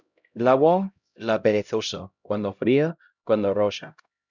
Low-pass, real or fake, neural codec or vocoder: 7.2 kHz; fake; codec, 16 kHz, 0.5 kbps, X-Codec, HuBERT features, trained on LibriSpeech